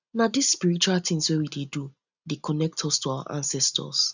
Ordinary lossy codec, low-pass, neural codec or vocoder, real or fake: none; 7.2 kHz; none; real